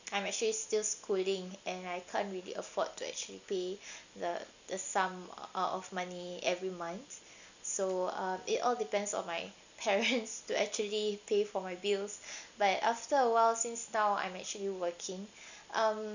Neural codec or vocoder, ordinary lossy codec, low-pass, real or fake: none; none; 7.2 kHz; real